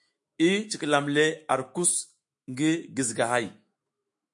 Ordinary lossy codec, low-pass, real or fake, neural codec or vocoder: MP3, 48 kbps; 10.8 kHz; fake; autoencoder, 48 kHz, 128 numbers a frame, DAC-VAE, trained on Japanese speech